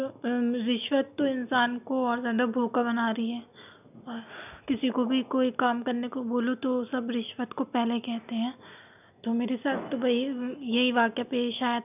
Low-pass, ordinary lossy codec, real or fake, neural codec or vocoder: 3.6 kHz; none; real; none